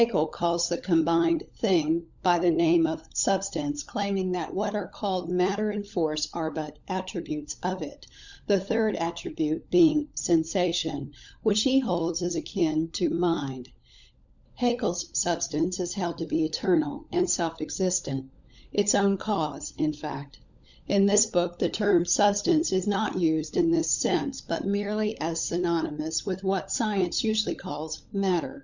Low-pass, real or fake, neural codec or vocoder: 7.2 kHz; fake; codec, 16 kHz, 16 kbps, FunCodec, trained on LibriTTS, 50 frames a second